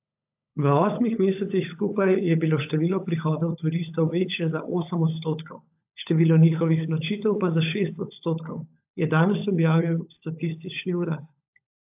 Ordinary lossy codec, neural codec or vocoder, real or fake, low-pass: AAC, 32 kbps; codec, 16 kHz, 16 kbps, FunCodec, trained on LibriTTS, 50 frames a second; fake; 3.6 kHz